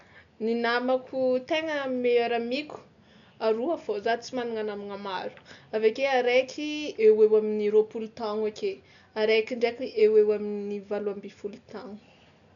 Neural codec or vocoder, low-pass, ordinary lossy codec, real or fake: none; 7.2 kHz; none; real